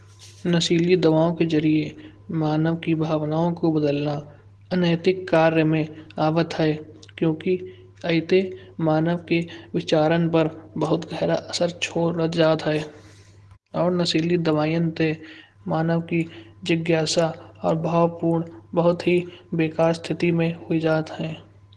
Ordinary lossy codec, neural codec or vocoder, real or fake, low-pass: Opus, 16 kbps; none; real; 10.8 kHz